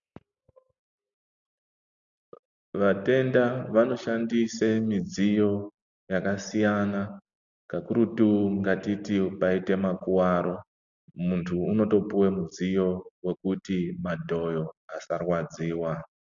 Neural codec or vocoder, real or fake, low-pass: none; real; 7.2 kHz